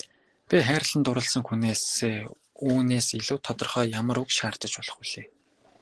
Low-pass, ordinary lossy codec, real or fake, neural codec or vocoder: 10.8 kHz; Opus, 16 kbps; real; none